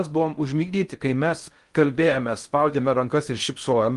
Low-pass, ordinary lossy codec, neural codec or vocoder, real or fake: 10.8 kHz; Opus, 32 kbps; codec, 16 kHz in and 24 kHz out, 0.6 kbps, FocalCodec, streaming, 4096 codes; fake